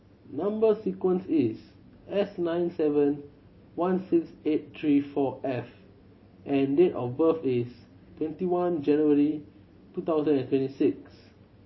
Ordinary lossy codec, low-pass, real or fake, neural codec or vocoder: MP3, 24 kbps; 7.2 kHz; real; none